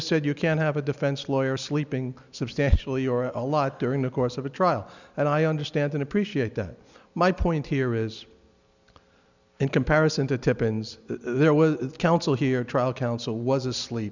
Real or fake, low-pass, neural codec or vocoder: real; 7.2 kHz; none